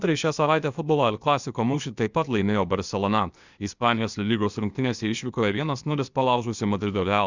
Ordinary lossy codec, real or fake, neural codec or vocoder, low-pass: Opus, 64 kbps; fake; codec, 16 kHz, 0.8 kbps, ZipCodec; 7.2 kHz